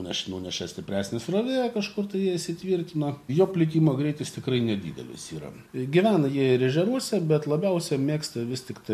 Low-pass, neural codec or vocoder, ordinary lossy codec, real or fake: 14.4 kHz; none; MP3, 64 kbps; real